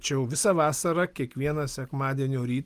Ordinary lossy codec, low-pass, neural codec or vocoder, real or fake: Opus, 32 kbps; 14.4 kHz; none; real